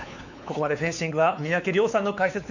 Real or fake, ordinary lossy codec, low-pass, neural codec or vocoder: fake; none; 7.2 kHz; codec, 16 kHz, 4 kbps, X-Codec, WavLM features, trained on Multilingual LibriSpeech